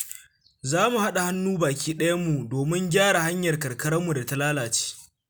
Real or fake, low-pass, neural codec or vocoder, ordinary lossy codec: real; none; none; none